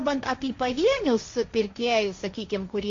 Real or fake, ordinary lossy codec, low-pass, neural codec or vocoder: fake; AAC, 48 kbps; 7.2 kHz; codec, 16 kHz, 1.1 kbps, Voila-Tokenizer